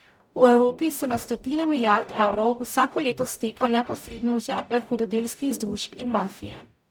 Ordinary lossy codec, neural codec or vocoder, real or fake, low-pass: none; codec, 44.1 kHz, 0.9 kbps, DAC; fake; none